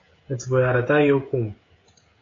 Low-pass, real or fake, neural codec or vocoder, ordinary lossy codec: 7.2 kHz; fake; codec, 16 kHz, 16 kbps, FreqCodec, smaller model; AAC, 32 kbps